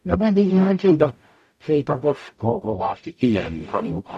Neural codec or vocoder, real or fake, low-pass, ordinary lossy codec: codec, 44.1 kHz, 0.9 kbps, DAC; fake; 14.4 kHz; none